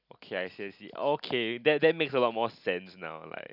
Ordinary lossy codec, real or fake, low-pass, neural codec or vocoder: none; real; 5.4 kHz; none